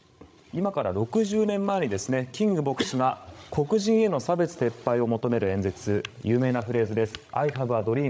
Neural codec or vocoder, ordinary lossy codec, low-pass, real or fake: codec, 16 kHz, 16 kbps, FreqCodec, larger model; none; none; fake